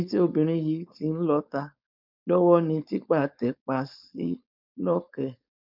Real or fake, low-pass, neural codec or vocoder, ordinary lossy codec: fake; 5.4 kHz; codec, 16 kHz, 4.8 kbps, FACodec; none